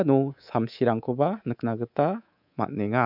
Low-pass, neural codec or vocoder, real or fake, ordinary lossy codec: 5.4 kHz; codec, 24 kHz, 3.1 kbps, DualCodec; fake; none